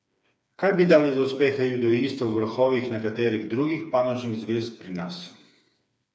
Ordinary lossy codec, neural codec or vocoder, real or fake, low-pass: none; codec, 16 kHz, 4 kbps, FreqCodec, smaller model; fake; none